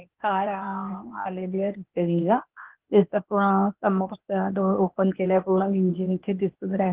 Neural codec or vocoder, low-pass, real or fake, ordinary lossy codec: codec, 16 kHz, 0.8 kbps, ZipCodec; 3.6 kHz; fake; Opus, 16 kbps